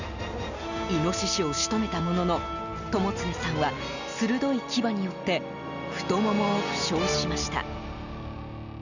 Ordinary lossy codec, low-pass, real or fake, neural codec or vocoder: none; 7.2 kHz; real; none